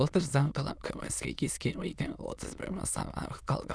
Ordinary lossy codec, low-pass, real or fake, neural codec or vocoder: none; none; fake; autoencoder, 22.05 kHz, a latent of 192 numbers a frame, VITS, trained on many speakers